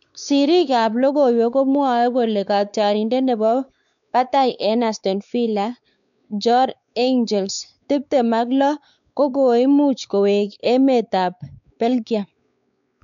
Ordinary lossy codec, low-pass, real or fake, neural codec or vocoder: none; 7.2 kHz; fake; codec, 16 kHz, 4 kbps, X-Codec, WavLM features, trained on Multilingual LibriSpeech